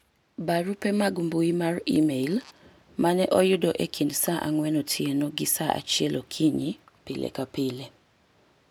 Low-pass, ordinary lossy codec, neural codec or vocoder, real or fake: none; none; none; real